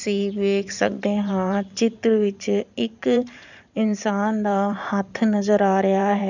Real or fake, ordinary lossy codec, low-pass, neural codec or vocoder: fake; none; 7.2 kHz; vocoder, 44.1 kHz, 128 mel bands every 512 samples, BigVGAN v2